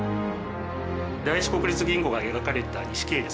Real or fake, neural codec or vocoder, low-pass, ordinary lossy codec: real; none; none; none